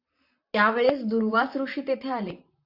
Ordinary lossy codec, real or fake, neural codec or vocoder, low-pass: AAC, 48 kbps; fake; vocoder, 44.1 kHz, 128 mel bands, Pupu-Vocoder; 5.4 kHz